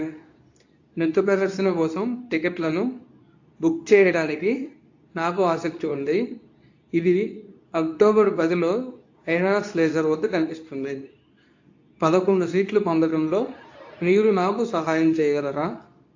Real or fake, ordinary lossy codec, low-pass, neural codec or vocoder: fake; AAC, 48 kbps; 7.2 kHz; codec, 24 kHz, 0.9 kbps, WavTokenizer, medium speech release version 2